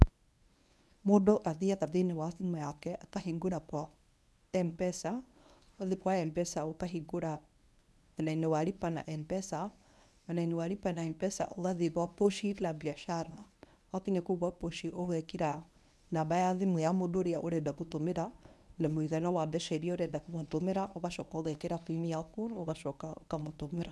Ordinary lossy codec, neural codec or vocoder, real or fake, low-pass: none; codec, 24 kHz, 0.9 kbps, WavTokenizer, medium speech release version 1; fake; none